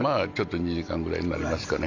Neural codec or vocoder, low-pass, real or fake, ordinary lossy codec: none; 7.2 kHz; real; AAC, 48 kbps